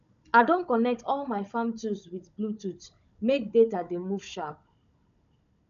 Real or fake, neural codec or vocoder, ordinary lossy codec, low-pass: fake; codec, 16 kHz, 16 kbps, FunCodec, trained on Chinese and English, 50 frames a second; none; 7.2 kHz